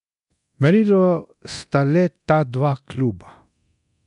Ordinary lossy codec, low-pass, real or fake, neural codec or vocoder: none; 10.8 kHz; fake; codec, 24 kHz, 0.9 kbps, DualCodec